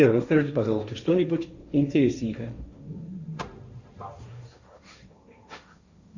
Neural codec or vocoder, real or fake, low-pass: codec, 16 kHz, 1.1 kbps, Voila-Tokenizer; fake; 7.2 kHz